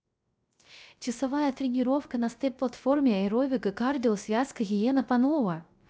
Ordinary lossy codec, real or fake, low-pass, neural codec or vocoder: none; fake; none; codec, 16 kHz, 0.3 kbps, FocalCodec